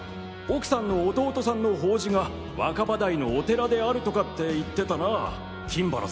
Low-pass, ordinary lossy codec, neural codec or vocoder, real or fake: none; none; none; real